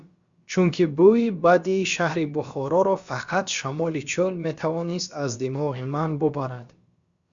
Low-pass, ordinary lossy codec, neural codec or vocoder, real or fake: 7.2 kHz; Opus, 64 kbps; codec, 16 kHz, about 1 kbps, DyCAST, with the encoder's durations; fake